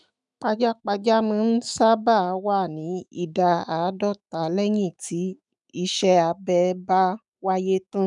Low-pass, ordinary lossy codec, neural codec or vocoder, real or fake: 10.8 kHz; none; autoencoder, 48 kHz, 128 numbers a frame, DAC-VAE, trained on Japanese speech; fake